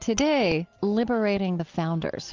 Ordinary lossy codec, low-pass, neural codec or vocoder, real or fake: Opus, 24 kbps; 7.2 kHz; none; real